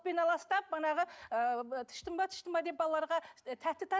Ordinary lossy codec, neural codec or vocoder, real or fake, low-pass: none; none; real; none